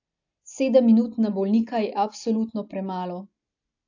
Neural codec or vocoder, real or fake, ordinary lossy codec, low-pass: none; real; AAC, 48 kbps; 7.2 kHz